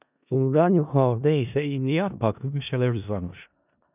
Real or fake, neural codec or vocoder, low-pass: fake; codec, 16 kHz in and 24 kHz out, 0.4 kbps, LongCat-Audio-Codec, four codebook decoder; 3.6 kHz